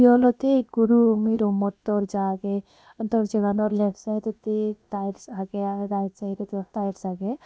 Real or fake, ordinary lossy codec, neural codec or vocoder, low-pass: fake; none; codec, 16 kHz, about 1 kbps, DyCAST, with the encoder's durations; none